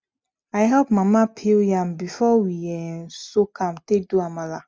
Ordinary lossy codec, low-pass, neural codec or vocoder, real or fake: none; none; none; real